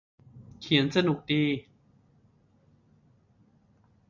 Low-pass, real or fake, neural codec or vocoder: 7.2 kHz; real; none